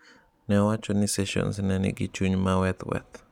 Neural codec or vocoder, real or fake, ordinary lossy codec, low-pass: none; real; none; 19.8 kHz